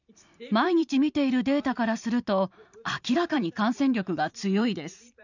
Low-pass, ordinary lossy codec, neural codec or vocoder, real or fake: 7.2 kHz; none; vocoder, 44.1 kHz, 128 mel bands every 512 samples, BigVGAN v2; fake